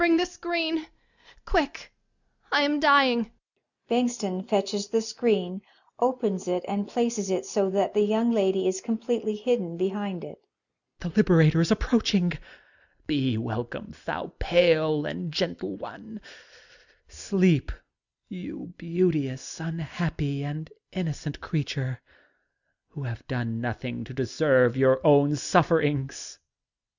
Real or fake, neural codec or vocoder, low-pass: real; none; 7.2 kHz